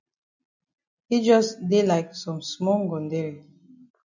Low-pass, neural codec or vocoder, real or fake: 7.2 kHz; none; real